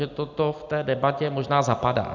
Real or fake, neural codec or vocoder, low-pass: real; none; 7.2 kHz